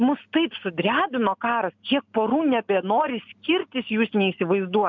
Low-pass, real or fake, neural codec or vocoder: 7.2 kHz; real; none